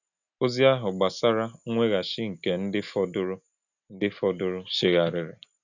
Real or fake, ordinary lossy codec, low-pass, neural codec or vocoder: real; none; 7.2 kHz; none